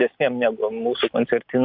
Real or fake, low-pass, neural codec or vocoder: real; 5.4 kHz; none